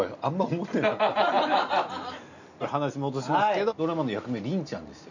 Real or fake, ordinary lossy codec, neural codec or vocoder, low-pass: real; none; none; 7.2 kHz